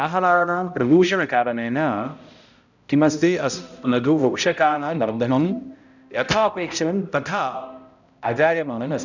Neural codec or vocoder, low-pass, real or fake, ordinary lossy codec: codec, 16 kHz, 0.5 kbps, X-Codec, HuBERT features, trained on balanced general audio; 7.2 kHz; fake; none